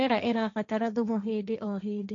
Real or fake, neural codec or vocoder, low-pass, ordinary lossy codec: fake; codec, 16 kHz, 1.1 kbps, Voila-Tokenizer; 7.2 kHz; none